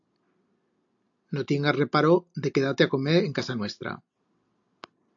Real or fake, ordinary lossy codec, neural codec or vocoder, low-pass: real; AAC, 64 kbps; none; 7.2 kHz